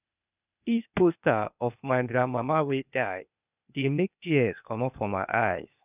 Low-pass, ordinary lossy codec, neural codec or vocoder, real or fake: 3.6 kHz; none; codec, 16 kHz, 0.8 kbps, ZipCodec; fake